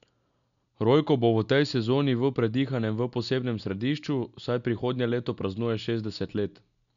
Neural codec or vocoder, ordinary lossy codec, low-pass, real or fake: none; none; 7.2 kHz; real